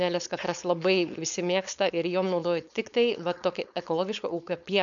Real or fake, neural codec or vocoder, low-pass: fake; codec, 16 kHz, 4.8 kbps, FACodec; 7.2 kHz